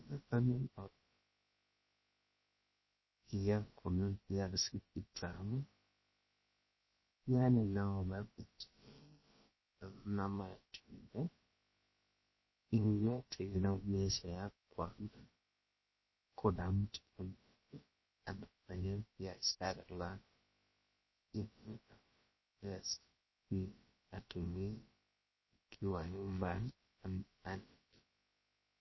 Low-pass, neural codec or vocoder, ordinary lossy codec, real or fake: 7.2 kHz; codec, 16 kHz, about 1 kbps, DyCAST, with the encoder's durations; MP3, 24 kbps; fake